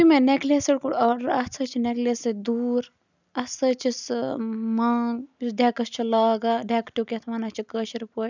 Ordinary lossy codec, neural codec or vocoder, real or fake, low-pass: none; none; real; 7.2 kHz